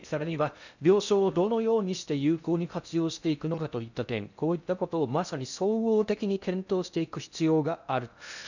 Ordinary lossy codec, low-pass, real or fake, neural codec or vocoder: none; 7.2 kHz; fake; codec, 16 kHz in and 24 kHz out, 0.6 kbps, FocalCodec, streaming, 2048 codes